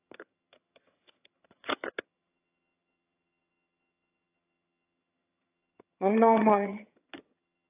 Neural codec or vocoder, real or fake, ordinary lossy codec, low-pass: vocoder, 22.05 kHz, 80 mel bands, HiFi-GAN; fake; AAC, 24 kbps; 3.6 kHz